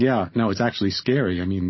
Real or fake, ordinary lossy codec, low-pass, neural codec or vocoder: real; MP3, 24 kbps; 7.2 kHz; none